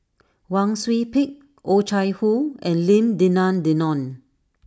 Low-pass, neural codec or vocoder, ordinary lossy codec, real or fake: none; none; none; real